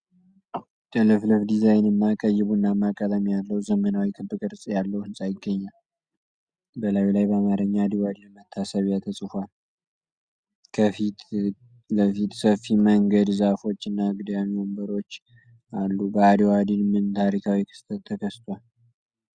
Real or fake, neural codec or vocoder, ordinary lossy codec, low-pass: real; none; Opus, 64 kbps; 9.9 kHz